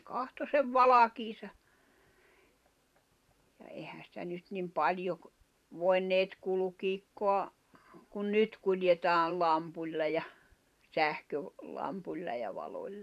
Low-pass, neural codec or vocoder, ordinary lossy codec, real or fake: 14.4 kHz; vocoder, 44.1 kHz, 128 mel bands every 512 samples, BigVGAN v2; none; fake